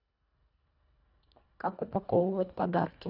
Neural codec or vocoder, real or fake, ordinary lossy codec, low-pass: codec, 24 kHz, 1.5 kbps, HILCodec; fake; none; 5.4 kHz